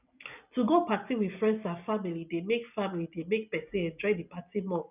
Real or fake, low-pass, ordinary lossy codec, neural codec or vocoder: real; 3.6 kHz; none; none